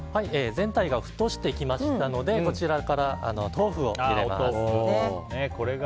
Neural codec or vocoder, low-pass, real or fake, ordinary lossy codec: none; none; real; none